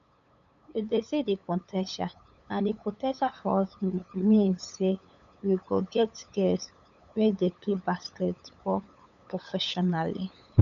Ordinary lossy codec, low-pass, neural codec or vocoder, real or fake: none; 7.2 kHz; codec, 16 kHz, 8 kbps, FunCodec, trained on LibriTTS, 25 frames a second; fake